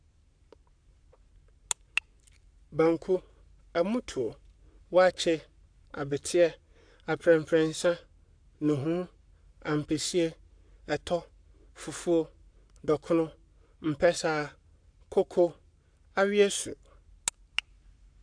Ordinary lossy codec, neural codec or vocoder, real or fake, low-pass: AAC, 64 kbps; codec, 44.1 kHz, 7.8 kbps, Pupu-Codec; fake; 9.9 kHz